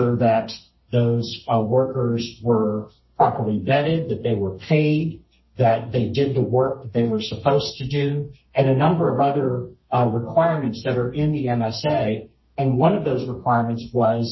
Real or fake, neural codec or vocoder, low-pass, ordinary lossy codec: fake; codec, 32 kHz, 1.9 kbps, SNAC; 7.2 kHz; MP3, 24 kbps